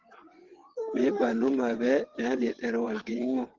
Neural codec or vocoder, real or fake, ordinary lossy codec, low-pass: vocoder, 22.05 kHz, 80 mel bands, WaveNeXt; fake; Opus, 16 kbps; 7.2 kHz